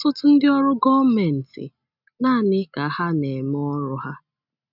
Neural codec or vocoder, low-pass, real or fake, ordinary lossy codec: none; 5.4 kHz; real; none